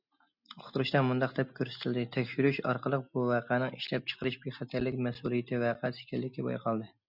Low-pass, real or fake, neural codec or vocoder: 5.4 kHz; real; none